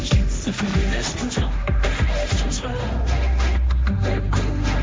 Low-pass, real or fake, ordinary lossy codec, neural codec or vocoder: none; fake; none; codec, 16 kHz, 1.1 kbps, Voila-Tokenizer